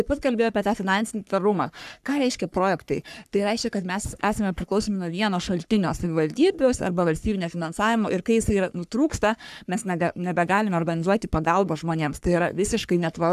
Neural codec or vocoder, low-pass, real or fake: codec, 44.1 kHz, 3.4 kbps, Pupu-Codec; 14.4 kHz; fake